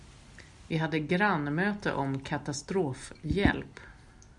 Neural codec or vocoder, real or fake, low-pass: none; real; 10.8 kHz